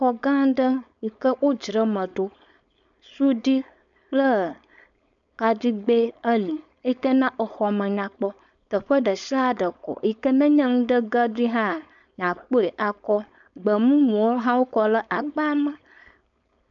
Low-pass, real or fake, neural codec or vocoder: 7.2 kHz; fake; codec, 16 kHz, 4.8 kbps, FACodec